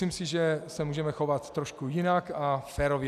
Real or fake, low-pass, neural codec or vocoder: real; 14.4 kHz; none